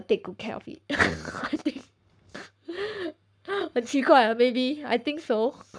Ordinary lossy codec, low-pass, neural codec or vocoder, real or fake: none; 9.9 kHz; codec, 44.1 kHz, 7.8 kbps, Pupu-Codec; fake